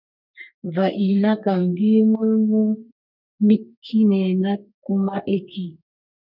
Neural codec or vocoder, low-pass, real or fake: codec, 32 kHz, 1.9 kbps, SNAC; 5.4 kHz; fake